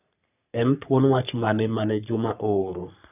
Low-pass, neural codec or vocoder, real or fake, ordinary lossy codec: 3.6 kHz; codec, 44.1 kHz, 3.4 kbps, Pupu-Codec; fake; none